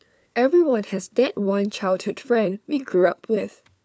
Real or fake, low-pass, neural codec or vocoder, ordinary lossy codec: fake; none; codec, 16 kHz, 4 kbps, FunCodec, trained on LibriTTS, 50 frames a second; none